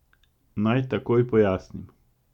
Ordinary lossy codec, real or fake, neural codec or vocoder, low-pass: none; real; none; 19.8 kHz